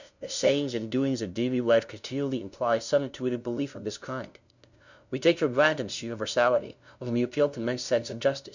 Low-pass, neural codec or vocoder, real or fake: 7.2 kHz; codec, 16 kHz, 0.5 kbps, FunCodec, trained on LibriTTS, 25 frames a second; fake